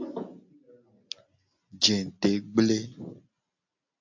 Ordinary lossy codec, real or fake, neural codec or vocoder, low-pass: AAC, 48 kbps; real; none; 7.2 kHz